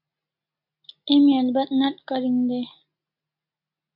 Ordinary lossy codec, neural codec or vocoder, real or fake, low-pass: MP3, 48 kbps; none; real; 5.4 kHz